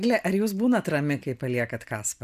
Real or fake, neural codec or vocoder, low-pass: real; none; 14.4 kHz